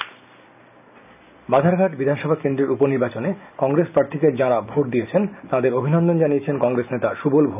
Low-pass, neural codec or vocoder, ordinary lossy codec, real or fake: 3.6 kHz; none; none; real